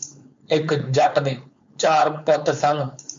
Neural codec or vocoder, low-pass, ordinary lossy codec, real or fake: codec, 16 kHz, 4.8 kbps, FACodec; 7.2 kHz; MP3, 48 kbps; fake